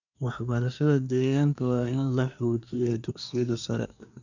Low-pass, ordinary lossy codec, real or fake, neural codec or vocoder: 7.2 kHz; none; fake; codec, 24 kHz, 1 kbps, SNAC